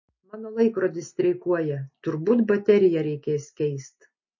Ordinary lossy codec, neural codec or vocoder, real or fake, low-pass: MP3, 32 kbps; none; real; 7.2 kHz